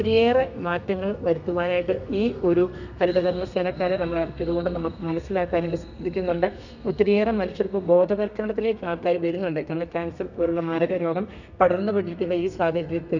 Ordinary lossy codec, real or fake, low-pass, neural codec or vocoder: none; fake; 7.2 kHz; codec, 32 kHz, 1.9 kbps, SNAC